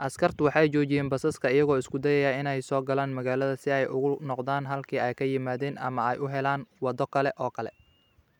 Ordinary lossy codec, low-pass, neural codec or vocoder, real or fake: none; 19.8 kHz; none; real